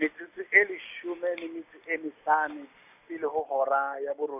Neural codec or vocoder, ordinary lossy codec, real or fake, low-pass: none; none; real; 3.6 kHz